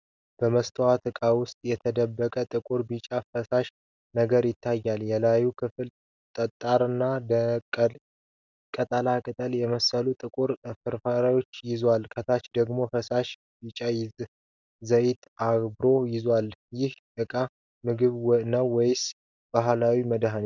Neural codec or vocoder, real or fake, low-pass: none; real; 7.2 kHz